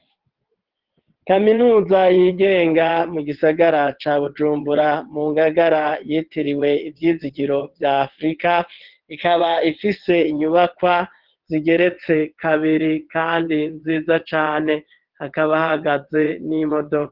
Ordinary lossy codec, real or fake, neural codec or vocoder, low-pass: Opus, 16 kbps; fake; vocoder, 22.05 kHz, 80 mel bands, WaveNeXt; 5.4 kHz